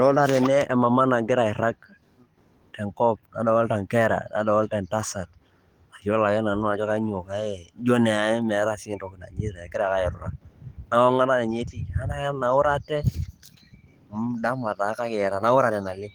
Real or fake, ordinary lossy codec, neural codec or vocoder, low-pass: fake; Opus, 24 kbps; codec, 44.1 kHz, 7.8 kbps, DAC; 19.8 kHz